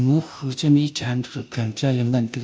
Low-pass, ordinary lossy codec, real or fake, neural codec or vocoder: none; none; fake; codec, 16 kHz, 0.5 kbps, FunCodec, trained on Chinese and English, 25 frames a second